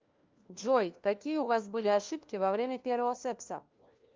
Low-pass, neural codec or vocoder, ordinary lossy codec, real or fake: 7.2 kHz; codec, 16 kHz, 1 kbps, FunCodec, trained on LibriTTS, 50 frames a second; Opus, 24 kbps; fake